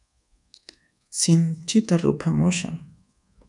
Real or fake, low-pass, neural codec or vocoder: fake; 10.8 kHz; codec, 24 kHz, 1.2 kbps, DualCodec